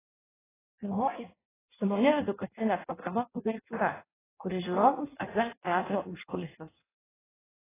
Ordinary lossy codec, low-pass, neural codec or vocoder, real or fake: AAC, 16 kbps; 3.6 kHz; codec, 16 kHz in and 24 kHz out, 0.6 kbps, FireRedTTS-2 codec; fake